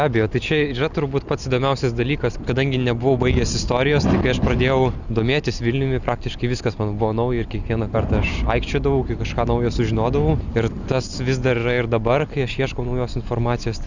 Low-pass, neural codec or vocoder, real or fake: 7.2 kHz; none; real